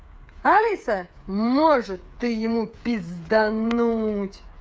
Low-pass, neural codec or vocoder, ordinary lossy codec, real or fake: none; codec, 16 kHz, 8 kbps, FreqCodec, smaller model; none; fake